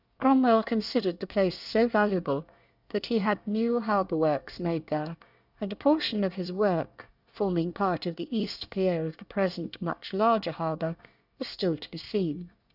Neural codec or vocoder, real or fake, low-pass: codec, 24 kHz, 1 kbps, SNAC; fake; 5.4 kHz